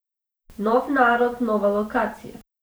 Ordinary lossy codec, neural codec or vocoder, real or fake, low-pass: none; vocoder, 44.1 kHz, 128 mel bands every 512 samples, BigVGAN v2; fake; none